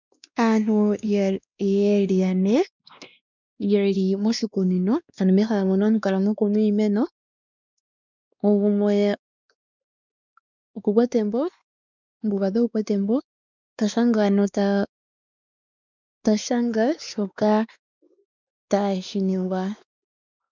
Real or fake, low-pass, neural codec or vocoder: fake; 7.2 kHz; codec, 16 kHz, 2 kbps, X-Codec, WavLM features, trained on Multilingual LibriSpeech